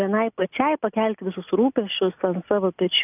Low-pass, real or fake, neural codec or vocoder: 3.6 kHz; real; none